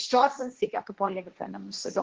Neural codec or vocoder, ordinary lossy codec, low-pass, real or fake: codec, 16 kHz, 1.1 kbps, Voila-Tokenizer; Opus, 16 kbps; 7.2 kHz; fake